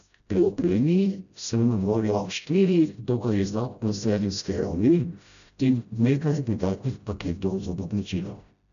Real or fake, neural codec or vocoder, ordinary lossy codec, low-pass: fake; codec, 16 kHz, 0.5 kbps, FreqCodec, smaller model; none; 7.2 kHz